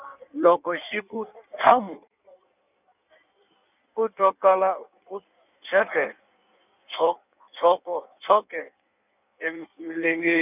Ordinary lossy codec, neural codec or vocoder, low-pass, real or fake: none; codec, 16 kHz in and 24 kHz out, 1.1 kbps, FireRedTTS-2 codec; 3.6 kHz; fake